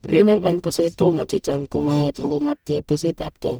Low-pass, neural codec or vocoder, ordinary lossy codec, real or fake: none; codec, 44.1 kHz, 0.9 kbps, DAC; none; fake